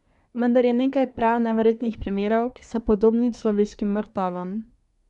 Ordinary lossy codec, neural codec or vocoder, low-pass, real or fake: none; codec, 24 kHz, 1 kbps, SNAC; 10.8 kHz; fake